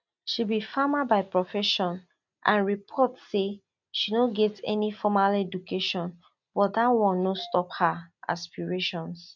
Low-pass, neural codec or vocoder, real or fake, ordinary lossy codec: 7.2 kHz; none; real; none